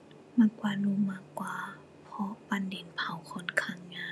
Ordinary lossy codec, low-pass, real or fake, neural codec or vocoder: none; none; real; none